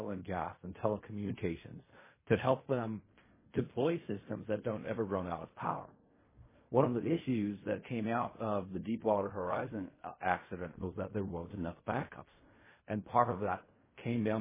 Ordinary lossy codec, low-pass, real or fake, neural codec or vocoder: MP3, 16 kbps; 3.6 kHz; fake; codec, 16 kHz in and 24 kHz out, 0.4 kbps, LongCat-Audio-Codec, fine tuned four codebook decoder